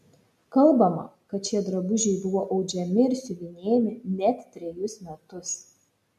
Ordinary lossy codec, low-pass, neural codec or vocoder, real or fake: MP3, 64 kbps; 14.4 kHz; none; real